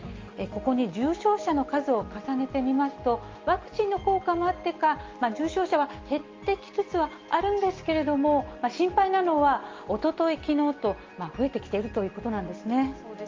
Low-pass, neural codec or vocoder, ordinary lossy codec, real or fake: 7.2 kHz; none; Opus, 32 kbps; real